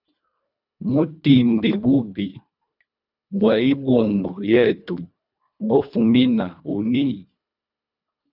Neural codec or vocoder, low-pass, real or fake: codec, 24 kHz, 1.5 kbps, HILCodec; 5.4 kHz; fake